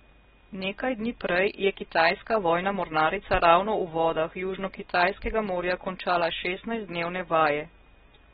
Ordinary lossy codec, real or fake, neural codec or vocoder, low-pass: AAC, 16 kbps; real; none; 19.8 kHz